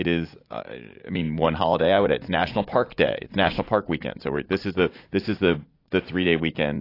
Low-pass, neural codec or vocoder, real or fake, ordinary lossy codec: 5.4 kHz; vocoder, 44.1 kHz, 80 mel bands, Vocos; fake; AAC, 32 kbps